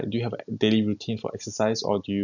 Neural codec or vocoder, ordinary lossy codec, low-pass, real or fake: none; none; 7.2 kHz; real